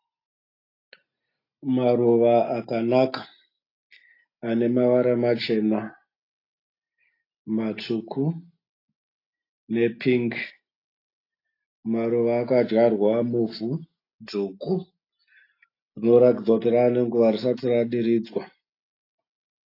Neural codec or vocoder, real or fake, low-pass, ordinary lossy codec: none; real; 5.4 kHz; AAC, 32 kbps